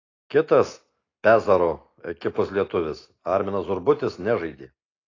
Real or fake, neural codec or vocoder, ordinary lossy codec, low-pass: real; none; AAC, 32 kbps; 7.2 kHz